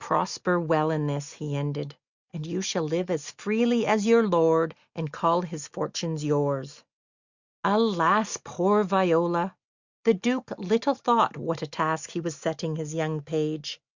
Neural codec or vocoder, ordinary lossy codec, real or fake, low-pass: none; Opus, 64 kbps; real; 7.2 kHz